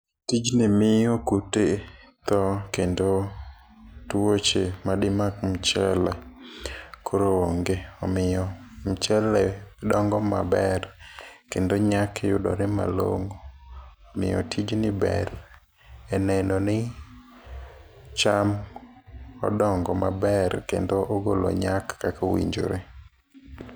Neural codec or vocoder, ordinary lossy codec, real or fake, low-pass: none; none; real; none